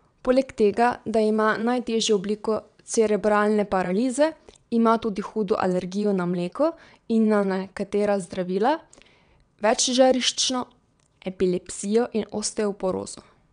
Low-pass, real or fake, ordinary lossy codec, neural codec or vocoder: 9.9 kHz; fake; none; vocoder, 22.05 kHz, 80 mel bands, WaveNeXt